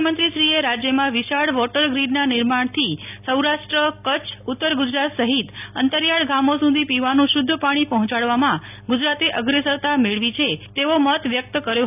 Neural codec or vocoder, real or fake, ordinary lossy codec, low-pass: none; real; none; 3.6 kHz